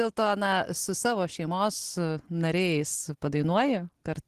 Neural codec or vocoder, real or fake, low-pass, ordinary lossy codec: none; real; 14.4 kHz; Opus, 16 kbps